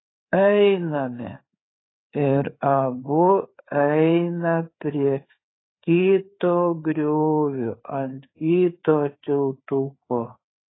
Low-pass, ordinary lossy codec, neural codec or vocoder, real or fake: 7.2 kHz; AAC, 16 kbps; codec, 16 kHz, 4 kbps, FreqCodec, larger model; fake